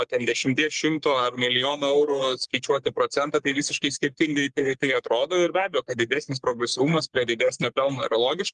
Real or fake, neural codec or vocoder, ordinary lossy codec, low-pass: fake; codec, 44.1 kHz, 3.4 kbps, Pupu-Codec; Opus, 32 kbps; 10.8 kHz